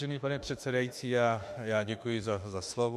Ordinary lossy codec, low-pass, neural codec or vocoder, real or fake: MP3, 64 kbps; 14.4 kHz; autoencoder, 48 kHz, 32 numbers a frame, DAC-VAE, trained on Japanese speech; fake